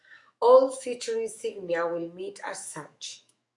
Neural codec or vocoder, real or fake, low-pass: autoencoder, 48 kHz, 128 numbers a frame, DAC-VAE, trained on Japanese speech; fake; 10.8 kHz